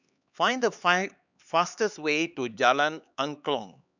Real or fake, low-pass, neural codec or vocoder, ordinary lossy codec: fake; 7.2 kHz; codec, 16 kHz, 4 kbps, X-Codec, HuBERT features, trained on LibriSpeech; none